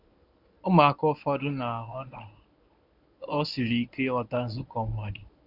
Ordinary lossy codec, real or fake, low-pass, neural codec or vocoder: none; fake; 5.4 kHz; codec, 24 kHz, 0.9 kbps, WavTokenizer, medium speech release version 1